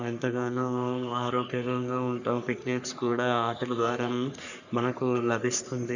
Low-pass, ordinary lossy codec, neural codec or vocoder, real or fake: 7.2 kHz; none; codec, 44.1 kHz, 3.4 kbps, Pupu-Codec; fake